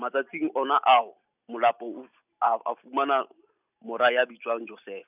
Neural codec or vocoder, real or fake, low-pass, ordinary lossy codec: none; real; 3.6 kHz; none